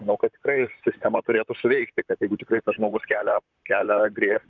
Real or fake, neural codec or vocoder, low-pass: fake; vocoder, 44.1 kHz, 128 mel bands, Pupu-Vocoder; 7.2 kHz